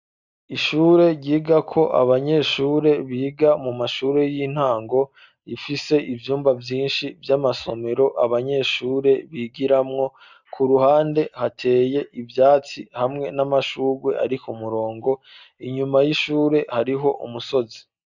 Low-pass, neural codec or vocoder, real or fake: 7.2 kHz; none; real